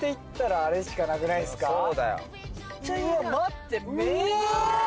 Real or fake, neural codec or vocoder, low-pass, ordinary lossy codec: real; none; none; none